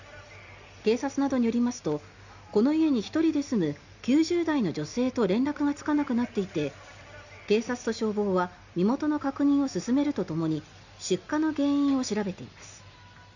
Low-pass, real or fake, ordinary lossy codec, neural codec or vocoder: 7.2 kHz; real; none; none